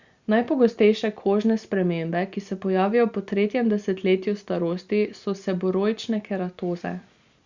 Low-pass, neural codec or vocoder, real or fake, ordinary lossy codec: 7.2 kHz; none; real; Opus, 64 kbps